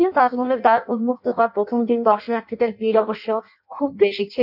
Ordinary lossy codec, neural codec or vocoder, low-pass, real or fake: none; codec, 16 kHz in and 24 kHz out, 0.6 kbps, FireRedTTS-2 codec; 5.4 kHz; fake